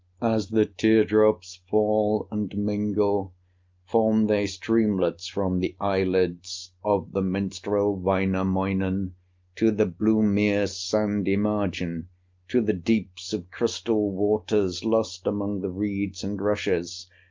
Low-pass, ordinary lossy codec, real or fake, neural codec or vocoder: 7.2 kHz; Opus, 24 kbps; real; none